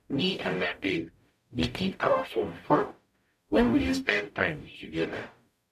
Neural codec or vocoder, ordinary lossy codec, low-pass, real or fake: codec, 44.1 kHz, 0.9 kbps, DAC; none; 14.4 kHz; fake